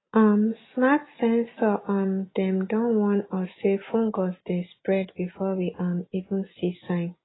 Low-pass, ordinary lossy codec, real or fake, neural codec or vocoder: 7.2 kHz; AAC, 16 kbps; real; none